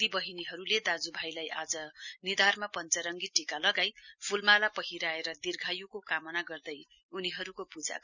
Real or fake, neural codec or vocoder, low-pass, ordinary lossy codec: real; none; 7.2 kHz; none